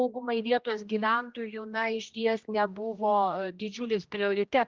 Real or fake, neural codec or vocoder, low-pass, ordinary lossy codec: fake; codec, 16 kHz, 1 kbps, X-Codec, HuBERT features, trained on general audio; 7.2 kHz; Opus, 32 kbps